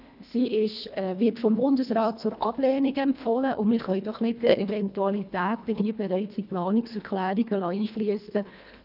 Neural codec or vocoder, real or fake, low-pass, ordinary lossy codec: codec, 24 kHz, 1.5 kbps, HILCodec; fake; 5.4 kHz; none